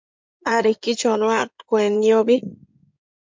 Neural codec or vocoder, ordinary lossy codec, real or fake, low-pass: codec, 16 kHz in and 24 kHz out, 2.2 kbps, FireRedTTS-2 codec; MP3, 64 kbps; fake; 7.2 kHz